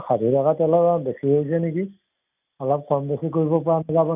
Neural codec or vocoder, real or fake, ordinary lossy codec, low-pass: none; real; none; 3.6 kHz